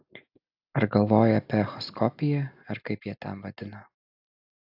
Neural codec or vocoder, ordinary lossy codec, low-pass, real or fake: none; AAC, 48 kbps; 5.4 kHz; real